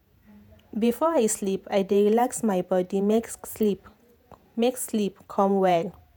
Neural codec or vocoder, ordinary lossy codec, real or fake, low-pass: vocoder, 48 kHz, 128 mel bands, Vocos; none; fake; none